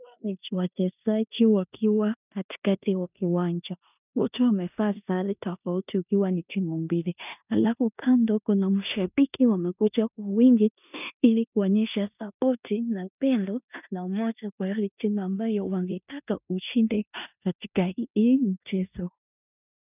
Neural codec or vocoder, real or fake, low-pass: codec, 16 kHz in and 24 kHz out, 0.9 kbps, LongCat-Audio-Codec, four codebook decoder; fake; 3.6 kHz